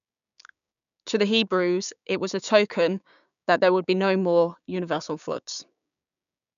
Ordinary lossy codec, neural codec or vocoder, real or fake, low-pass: none; codec, 16 kHz, 6 kbps, DAC; fake; 7.2 kHz